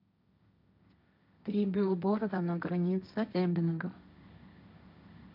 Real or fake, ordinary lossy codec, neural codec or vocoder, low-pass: fake; none; codec, 16 kHz, 1.1 kbps, Voila-Tokenizer; 5.4 kHz